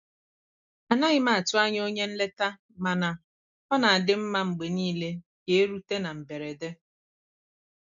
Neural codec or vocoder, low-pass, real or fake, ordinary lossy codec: none; 7.2 kHz; real; MP3, 64 kbps